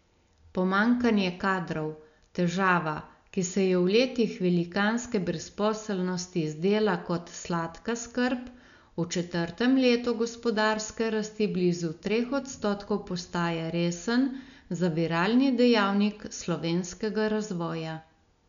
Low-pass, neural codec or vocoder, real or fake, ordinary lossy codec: 7.2 kHz; none; real; none